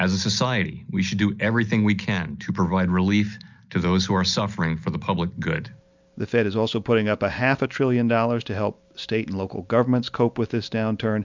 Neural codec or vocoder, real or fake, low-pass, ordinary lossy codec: none; real; 7.2 kHz; MP3, 64 kbps